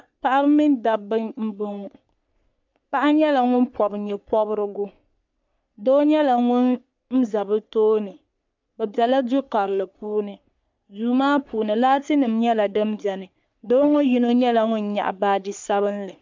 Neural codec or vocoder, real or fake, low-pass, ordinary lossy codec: codec, 44.1 kHz, 3.4 kbps, Pupu-Codec; fake; 7.2 kHz; MP3, 64 kbps